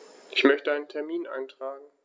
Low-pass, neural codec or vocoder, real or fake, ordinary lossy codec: none; none; real; none